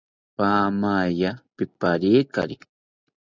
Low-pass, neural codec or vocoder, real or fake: 7.2 kHz; none; real